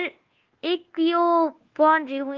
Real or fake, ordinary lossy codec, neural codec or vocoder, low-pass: fake; Opus, 16 kbps; codec, 24 kHz, 1.2 kbps, DualCodec; 7.2 kHz